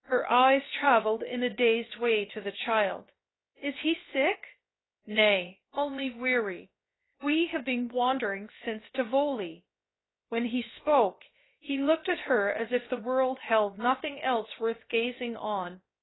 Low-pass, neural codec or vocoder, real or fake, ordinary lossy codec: 7.2 kHz; codec, 16 kHz, about 1 kbps, DyCAST, with the encoder's durations; fake; AAC, 16 kbps